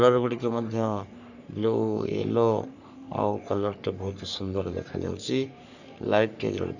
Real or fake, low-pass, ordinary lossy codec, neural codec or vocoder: fake; 7.2 kHz; none; codec, 44.1 kHz, 3.4 kbps, Pupu-Codec